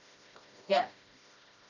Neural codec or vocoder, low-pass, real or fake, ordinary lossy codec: codec, 16 kHz, 1 kbps, FreqCodec, smaller model; 7.2 kHz; fake; none